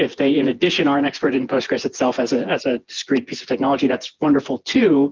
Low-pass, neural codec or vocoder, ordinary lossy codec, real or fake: 7.2 kHz; vocoder, 24 kHz, 100 mel bands, Vocos; Opus, 32 kbps; fake